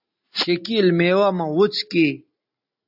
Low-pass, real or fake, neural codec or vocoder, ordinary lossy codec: 5.4 kHz; real; none; AAC, 48 kbps